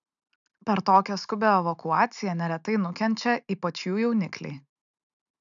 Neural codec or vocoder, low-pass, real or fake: none; 7.2 kHz; real